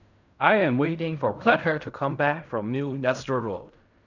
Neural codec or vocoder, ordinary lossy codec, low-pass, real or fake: codec, 16 kHz in and 24 kHz out, 0.4 kbps, LongCat-Audio-Codec, fine tuned four codebook decoder; none; 7.2 kHz; fake